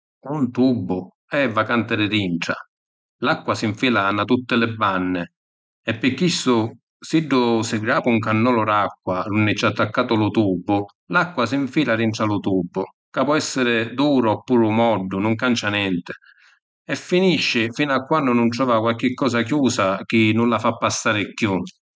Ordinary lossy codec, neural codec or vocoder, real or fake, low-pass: none; none; real; none